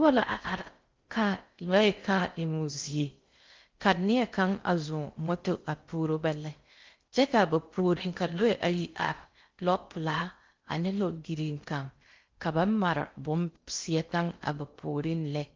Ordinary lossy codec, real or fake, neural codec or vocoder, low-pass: Opus, 32 kbps; fake; codec, 16 kHz in and 24 kHz out, 0.6 kbps, FocalCodec, streaming, 4096 codes; 7.2 kHz